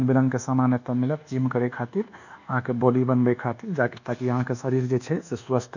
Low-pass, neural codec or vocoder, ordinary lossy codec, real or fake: 7.2 kHz; codec, 24 kHz, 1.2 kbps, DualCodec; none; fake